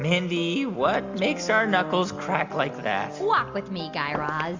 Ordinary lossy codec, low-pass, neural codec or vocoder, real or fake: MP3, 64 kbps; 7.2 kHz; none; real